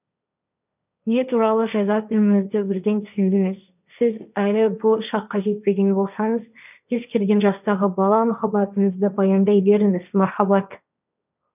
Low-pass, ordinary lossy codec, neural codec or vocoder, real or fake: 3.6 kHz; none; codec, 16 kHz, 1.1 kbps, Voila-Tokenizer; fake